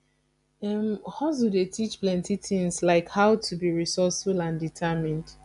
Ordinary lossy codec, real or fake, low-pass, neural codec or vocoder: none; real; 10.8 kHz; none